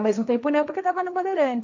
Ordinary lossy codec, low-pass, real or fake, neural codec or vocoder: none; 7.2 kHz; fake; codec, 16 kHz, 1.1 kbps, Voila-Tokenizer